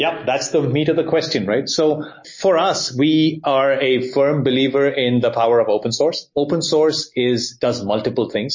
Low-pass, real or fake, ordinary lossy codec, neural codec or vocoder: 7.2 kHz; real; MP3, 32 kbps; none